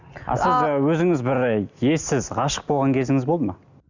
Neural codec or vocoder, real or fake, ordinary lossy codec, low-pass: none; real; Opus, 64 kbps; 7.2 kHz